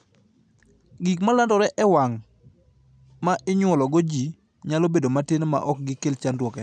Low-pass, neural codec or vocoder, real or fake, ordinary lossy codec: 9.9 kHz; none; real; none